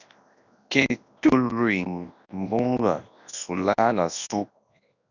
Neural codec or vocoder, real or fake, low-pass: codec, 24 kHz, 0.9 kbps, WavTokenizer, large speech release; fake; 7.2 kHz